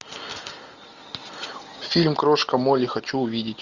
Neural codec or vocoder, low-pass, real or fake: none; 7.2 kHz; real